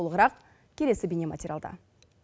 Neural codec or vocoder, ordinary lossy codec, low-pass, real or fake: none; none; none; real